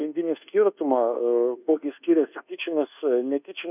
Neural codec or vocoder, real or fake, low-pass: codec, 24 kHz, 1.2 kbps, DualCodec; fake; 3.6 kHz